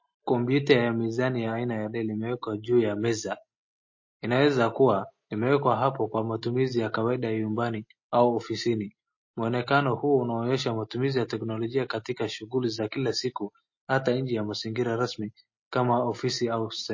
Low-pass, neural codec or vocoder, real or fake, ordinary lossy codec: 7.2 kHz; none; real; MP3, 32 kbps